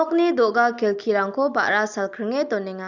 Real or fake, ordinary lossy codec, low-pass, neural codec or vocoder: real; Opus, 64 kbps; 7.2 kHz; none